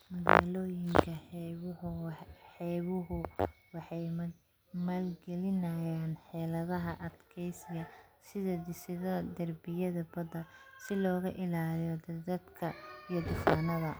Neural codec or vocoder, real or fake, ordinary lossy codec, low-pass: none; real; none; none